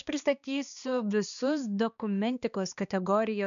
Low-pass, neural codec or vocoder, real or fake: 7.2 kHz; codec, 16 kHz, 2 kbps, X-Codec, HuBERT features, trained on balanced general audio; fake